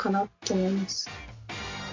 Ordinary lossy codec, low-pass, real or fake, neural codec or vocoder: MP3, 48 kbps; 7.2 kHz; real; none